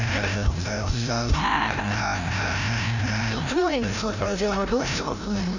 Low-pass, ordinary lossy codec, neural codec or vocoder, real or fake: 7.2 kHz; none; codec, 16 kHz, 0.5 kbps, FreqCodec, larger model; fake